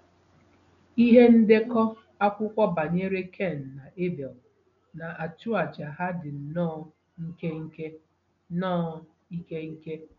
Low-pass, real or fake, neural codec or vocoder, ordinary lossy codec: 7.2 kHz; real; none; none